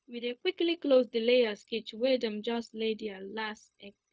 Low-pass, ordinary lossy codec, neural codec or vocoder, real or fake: none; none; codec, 16 kHz, 0.4 kbps, LongCat-Audio-Codec; fake